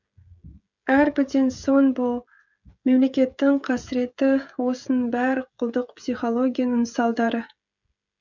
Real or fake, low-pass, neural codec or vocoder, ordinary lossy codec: fake; 7.2 kHz; codec, 16 kHz, 16 kbps, FreqCodec, smaller model; none